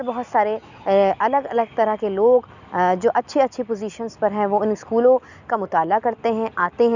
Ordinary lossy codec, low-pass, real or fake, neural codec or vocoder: none; 7.2 kHz; real; none